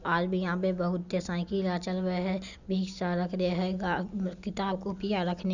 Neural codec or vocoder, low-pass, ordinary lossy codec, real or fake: none; 7.2 kHz; none; real